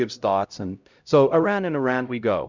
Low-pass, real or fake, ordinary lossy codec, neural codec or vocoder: 7.2 kHz; fake; Opus, 64 kbps; codec, 16 kHz, 0.5 kbps, X-Codec, HuBERT features, trained on LibriSpeech